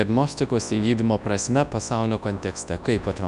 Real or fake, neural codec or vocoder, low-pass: fake; codec, 24 kHz, 0.9 kbps, WavTokenizer, large speech release; 10.8 kHz